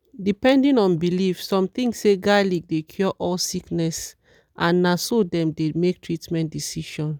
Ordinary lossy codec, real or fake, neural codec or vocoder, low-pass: none; real; none; none